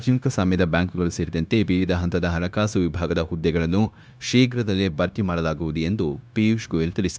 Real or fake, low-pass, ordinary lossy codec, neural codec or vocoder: fake; none; none; codec, 16 kHz, 0.9 kbps, LongCat-Audio-Codec